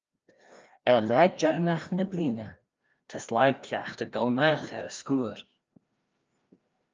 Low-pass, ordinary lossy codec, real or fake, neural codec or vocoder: 7.2 kHz; Opus, 24 kbps; fake; codec, 16 kHz, 1 kbps, FreqCodec, larger model